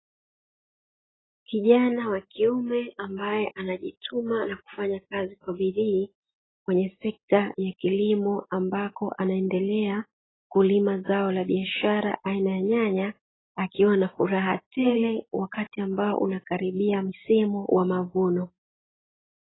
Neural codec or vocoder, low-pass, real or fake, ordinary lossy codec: none; 7.2 kHz; real; AAC, 16 kbps